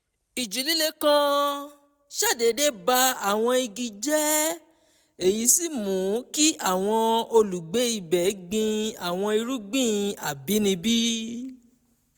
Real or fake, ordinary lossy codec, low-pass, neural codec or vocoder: real; none; none; none